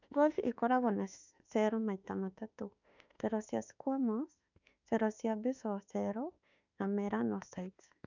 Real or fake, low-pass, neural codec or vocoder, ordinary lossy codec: fake; 7.2 kHz; autoencoder, 48 kHz, 32 numbers a frame, DAC-VAE, trained on Japanese speech; none